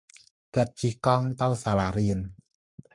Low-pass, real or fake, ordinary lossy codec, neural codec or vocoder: 10.8 kHz; fake; MP3, 64 kbps; codec, 44.1 kHz, 7.8 kbps, Pupu-Codec